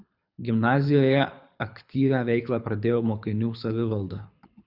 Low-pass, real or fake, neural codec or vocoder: 5.4 kHz; fake; codec, 24 kHz, 6 kbps, HILCodec